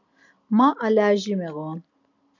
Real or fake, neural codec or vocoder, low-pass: real; none; 7.2 kHz